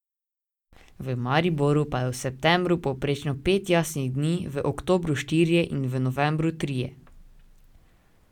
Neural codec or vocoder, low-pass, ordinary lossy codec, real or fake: none; 19.8 kHz; none; real